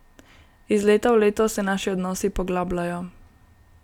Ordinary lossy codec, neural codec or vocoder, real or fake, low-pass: none; none; real; 19.8 kHz